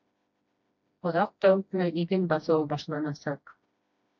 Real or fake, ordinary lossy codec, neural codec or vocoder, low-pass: fake; MP3, 48 kbps; codec, 16 kHz, 1 kbps, FreqCodec, smaller model; 7.2 kHz